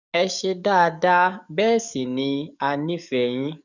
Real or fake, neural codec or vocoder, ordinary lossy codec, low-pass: fake; codec, 44.1 kHz, 7.8 kbps, DAC; none; 7.2 kHz